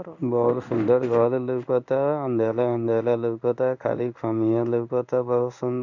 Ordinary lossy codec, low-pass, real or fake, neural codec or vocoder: none; 7.2 kHz; fake; codec, 16 kHz in and 24 kHz out, 1 kbps, XY-Tokenizer